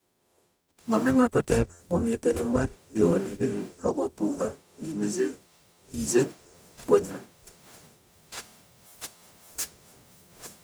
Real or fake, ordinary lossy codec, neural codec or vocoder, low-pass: fake; none; codec, 44.1 kHz, 0.9 kbps, DAC; none